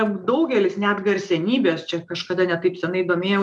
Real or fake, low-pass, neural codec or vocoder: real; 10.8 kHz; none